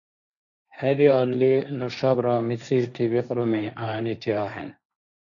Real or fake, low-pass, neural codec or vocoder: fake; 7.2 kHz; codec, 16 kHz, 1.1 kbps, Voila-Tokenizer